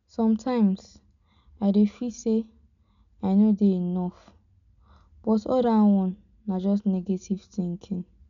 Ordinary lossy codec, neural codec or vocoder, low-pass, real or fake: none; none; 7.2 kHz; real